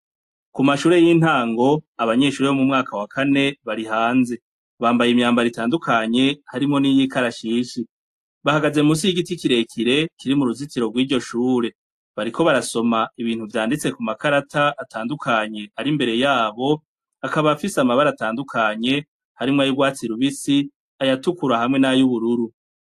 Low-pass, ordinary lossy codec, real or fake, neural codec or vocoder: 14.4 kHz; AAC, 64 kbps; real; none